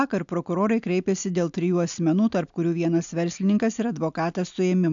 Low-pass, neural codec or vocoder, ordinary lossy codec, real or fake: 7.2 kHz; none; AAC, 64 kbps; real